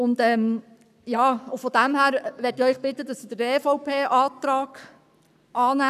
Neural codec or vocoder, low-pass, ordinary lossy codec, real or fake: codec, 44.1 kHz, 7.8 kbps, Pupu-Codec; 14.4 kHz; none; fake